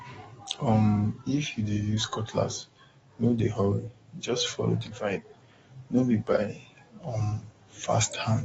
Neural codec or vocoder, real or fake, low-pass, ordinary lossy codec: codec, 44.1 kHz, 7.8 kbps, DAC; fake; 19.8 kHz; AAC, 24 kbps